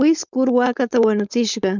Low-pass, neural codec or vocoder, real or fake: 7.2 kHz; codec, 16 kHz, 4.8 kbps, FACodec; fake